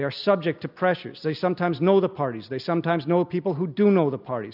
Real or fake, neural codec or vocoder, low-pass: real; none; 5.4 kHz